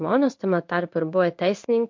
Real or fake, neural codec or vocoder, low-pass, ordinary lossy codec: fake; codec, 16 kHz in and 24 kHz out, 1 kbps, XY-Tokenizer; 7.2 kHz; MP3, 48 kbps